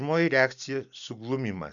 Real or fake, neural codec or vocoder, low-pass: real; none; 7.2 kHz